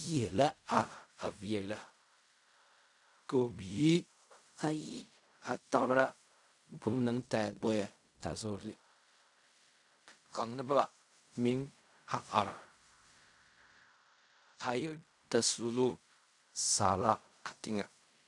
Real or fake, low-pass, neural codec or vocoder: fake; 10.8 kHz; codec, 16 kHz in and 24 kHz out, 0.4 kbps, LongCat-Audio-Codec, fine tuned four codebook decoder